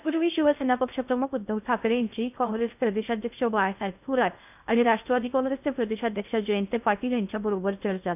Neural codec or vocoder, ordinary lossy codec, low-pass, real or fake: codec, 16 kHz in and 24 kHz out, 0.6 kbps, FocalCodec, streaming, 2048 codes; none; 3.6 kHz; fake